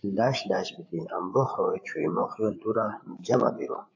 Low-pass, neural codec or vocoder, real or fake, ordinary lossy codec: 7.2 kHz; codec, 16 kHz, 8 kbps, FreqCodec, larger model; fake; AAC, 48 kbps